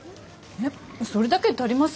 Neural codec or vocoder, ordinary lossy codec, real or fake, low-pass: none; none; real; none